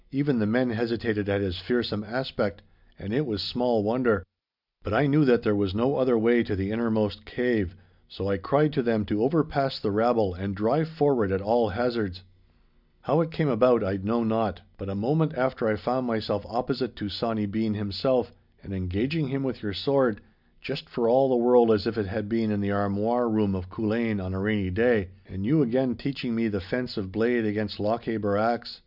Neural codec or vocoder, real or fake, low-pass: none; real; 5.4 kHz